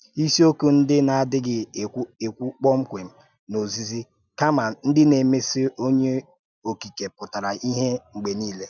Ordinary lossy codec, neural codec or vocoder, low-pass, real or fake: none; none; 7.2 kHz; real